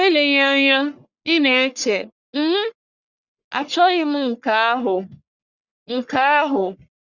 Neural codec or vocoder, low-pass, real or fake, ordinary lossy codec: codec, 44.1 kHz, 1.7 kbps, Pupu-Codec; 7.2 kHz; fake; Opus, 64 kbps